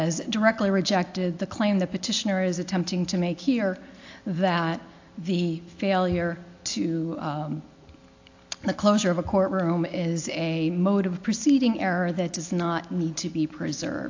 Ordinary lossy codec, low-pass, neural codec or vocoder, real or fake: AAC, 48 kbps; 7.2 kHz; none; real